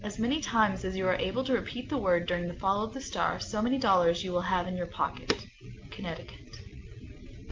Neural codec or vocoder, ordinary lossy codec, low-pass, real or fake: none; Opus, 32 kbps; 7.2 kHz; real